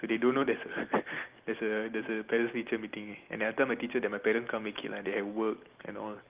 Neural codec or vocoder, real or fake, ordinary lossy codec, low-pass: none; real; Opus, 32 kbps; 3.6 kHz